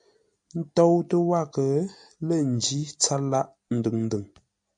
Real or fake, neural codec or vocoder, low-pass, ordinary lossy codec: real; none; 9.9 kHz; MP3, 96 kbps